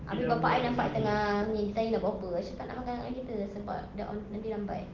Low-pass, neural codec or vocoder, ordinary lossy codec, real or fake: 7.2 kHz; none; Opus, 16 kbps; real